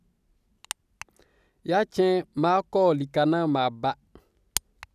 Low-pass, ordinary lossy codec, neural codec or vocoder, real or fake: 14.4 kHz; none; none; real